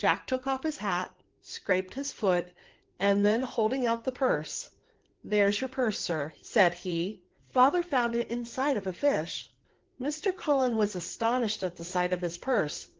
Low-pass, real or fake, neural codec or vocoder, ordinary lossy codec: 7.2 kHz; fake; codec, 16 kHz in and 24 kHz out, 2.2 kbps, FireRedTTS-2 codec; Opus, 16 kbps